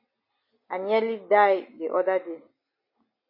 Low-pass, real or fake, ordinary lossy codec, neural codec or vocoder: 5.4 kHz; real; MP3, 24 kbps; none